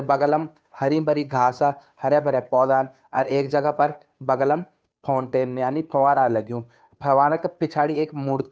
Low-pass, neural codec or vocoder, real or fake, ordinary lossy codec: none; codec, 16 kHz, 2 kbps, FunCodec, trained on Chinese and English, 25 frames a second; fake; none